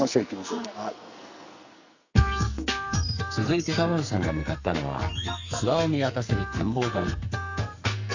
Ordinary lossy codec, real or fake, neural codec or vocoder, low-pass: Opus, 64 kbps; fake; codec, 44.1 kHz, 2.6 kbps, SNAC; 7.2 kHz